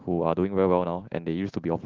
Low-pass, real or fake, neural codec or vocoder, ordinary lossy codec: 7.2 kHz; real; none; Opus, 16 kbps